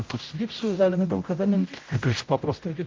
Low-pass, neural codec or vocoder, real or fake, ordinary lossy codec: 7.2 kHz; codec, 16 kHz, 0.5 kbps, X-Codec, HuBERT features, trained on general audio; fake; Opus, 24 kbps